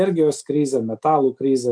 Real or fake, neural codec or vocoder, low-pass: real; none; 9.9 kHz